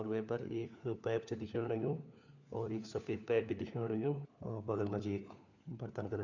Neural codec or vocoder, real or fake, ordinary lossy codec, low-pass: codec, 16 kHz, 4 kbps, FunCodec, trained on LibriTTS, 50 frames a second; fake; none; 7.2 kHz